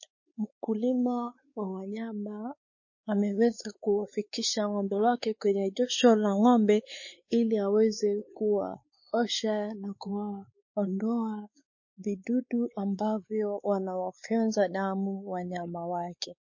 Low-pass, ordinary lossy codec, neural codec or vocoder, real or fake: 7.2 kHz; MP3, 32 kbps; codec, 16 kHz, 4 kbps, X-Codec, WavLM features, trained on Multilingual LibriSpeech; fake